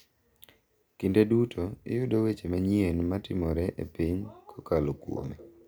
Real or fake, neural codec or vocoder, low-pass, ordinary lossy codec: real; none; none; none